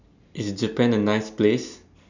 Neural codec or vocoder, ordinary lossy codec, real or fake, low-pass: none; none; real; 7.2 kHz